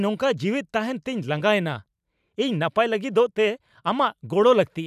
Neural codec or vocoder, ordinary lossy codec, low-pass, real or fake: vocoder, 44.1 kHz, 128 mel bands every 512 samples, BigVGAN v2; none; 14.4 kHz; fake